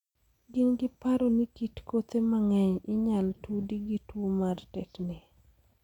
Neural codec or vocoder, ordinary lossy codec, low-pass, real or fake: none; none; 19.8 kHz; real